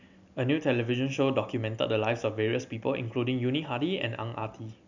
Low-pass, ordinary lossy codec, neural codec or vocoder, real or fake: 7.2 kHz; none; none; real